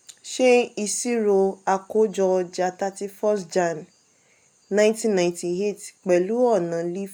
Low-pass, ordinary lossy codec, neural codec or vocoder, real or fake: none; none; none; real